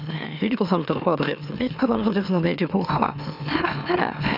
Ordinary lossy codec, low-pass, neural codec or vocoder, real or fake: none; 5.4 kHz; autoencoder, 44.1 kHz, a latent of 192 numbers a frame, MeloTTS; fake